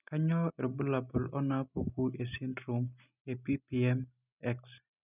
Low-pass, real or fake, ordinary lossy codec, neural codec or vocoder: 3.6 kHz; real; none; none